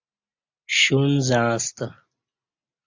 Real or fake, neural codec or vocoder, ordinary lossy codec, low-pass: real; none; AAC, 48 kbps; 7.2 kHz